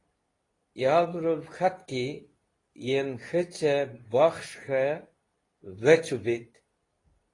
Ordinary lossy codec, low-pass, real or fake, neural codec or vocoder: AAC, 32 kbps; 10.8 kHz; fake; codec, 24 kHz, 0.9 kbps, WavTokenizer, medium speech release version 2